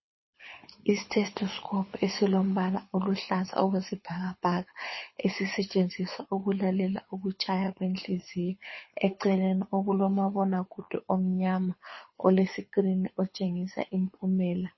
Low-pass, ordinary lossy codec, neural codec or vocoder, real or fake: 7.2 kHz; MP3, 24 kbps; codec, 24 kHz, 6 kbps, HILCodec; fake